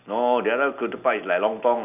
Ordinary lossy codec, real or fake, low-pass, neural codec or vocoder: none; real; 3.6 kHz; none